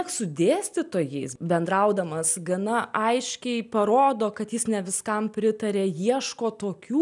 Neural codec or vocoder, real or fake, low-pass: none; real; 10.8 kHz